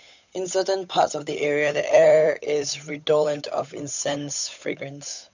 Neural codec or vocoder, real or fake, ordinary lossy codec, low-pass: codec, 16 kHz, 8 kbps, FunCodec, trained on Chinese and English, 25 frames a second; fake; none; 7.2 kHz